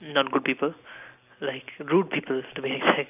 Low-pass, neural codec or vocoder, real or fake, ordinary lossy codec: 3.6 kHz; none; real; none